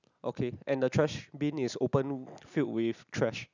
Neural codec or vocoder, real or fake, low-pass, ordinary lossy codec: none; real; 7.2 kHz; none